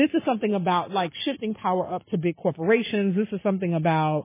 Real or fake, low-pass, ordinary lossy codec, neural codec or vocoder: fake; 3.6 kHz; MP3, 16 kbps; codec, 44.1 kHz, 7.8 kbps, Pupu-Codec